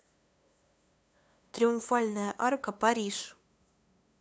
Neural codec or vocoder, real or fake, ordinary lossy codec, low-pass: codec, 16 kHz, 2 kbps, FunCodec, trained on LibriTTS, 25 frames a second; fake; none; none